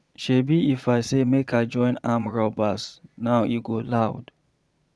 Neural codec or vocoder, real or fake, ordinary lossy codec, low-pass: vocoder, 22.05 kHz, 80 mel bands, Vocos; fake; none; none